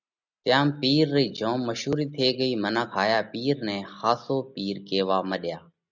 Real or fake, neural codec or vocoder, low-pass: real; none; 7.2 kHz